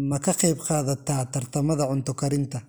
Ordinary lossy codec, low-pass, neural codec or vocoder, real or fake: none; none; none; real